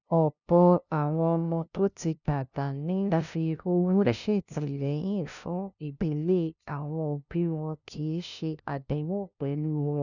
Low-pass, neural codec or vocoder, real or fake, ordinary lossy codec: 7.2 kHz; codec, 16 kHz, 0.5 kbps, FunCodec, trained on LibriTTS, 25 frames a second; fake; none